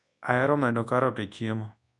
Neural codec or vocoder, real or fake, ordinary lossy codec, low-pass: codec, 24 kHz, 0.9 kbps, WavTokenizer, large speech release; fake; none; 10.8 kHz